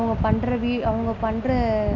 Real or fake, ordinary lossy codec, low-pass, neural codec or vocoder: real; none; 7.2 kHz; none